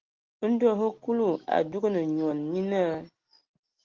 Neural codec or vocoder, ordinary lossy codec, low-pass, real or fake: none; Opus, 16 kbps; 7.2 kHz; real